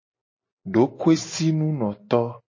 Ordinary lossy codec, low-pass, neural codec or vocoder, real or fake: MP3, 32 kbps; 7.2 kHz; none; real